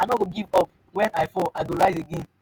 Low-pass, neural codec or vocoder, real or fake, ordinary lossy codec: none; vocoder, 48 kHz, 128 mel bands, Vocos; fake; none